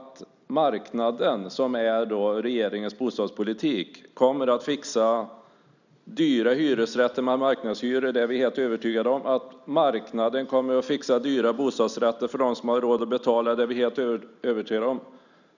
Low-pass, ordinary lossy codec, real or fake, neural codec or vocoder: 7.2 kHz; none; real; none